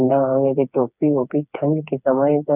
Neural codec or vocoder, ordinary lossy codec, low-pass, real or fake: codec, 16 kHz, 4 kbps, FreqCodec, smaller model; none; 3.6 kHz; fake